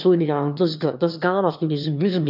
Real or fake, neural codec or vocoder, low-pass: fake; autoencoder, 22.05 kHz, a latent of 192 numbers a frame, VITS, trained on one speaker; 5.4 kHz